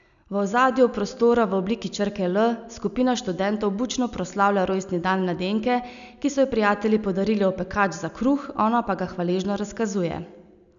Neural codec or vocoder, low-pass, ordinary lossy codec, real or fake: none; 7.2 kHz; none; real